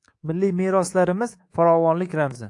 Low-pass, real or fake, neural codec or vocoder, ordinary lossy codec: 10.8 kHz; fake; codec, 24 kHz, 3.1 kbps, DualCodec; AAC, 48 kbps